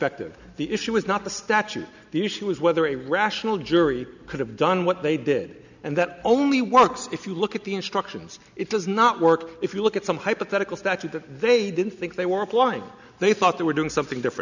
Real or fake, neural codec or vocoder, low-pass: real; none; 7.2 kHz